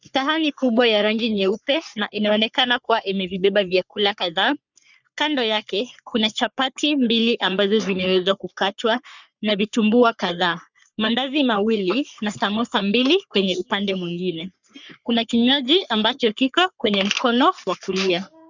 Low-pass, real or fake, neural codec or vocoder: 7.2 kHz; fake; codec, 44.1 kHz, 3.4 kbps, Pupu-Codec